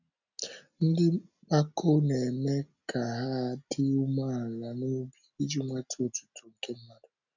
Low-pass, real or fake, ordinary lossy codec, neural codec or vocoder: 7.2 kHz; real; none; none